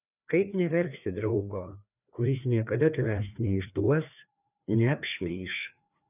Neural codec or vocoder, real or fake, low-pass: codec, 16 kHz, 2 kbps, FreqCodec, larger model; fake; 3.6 kHz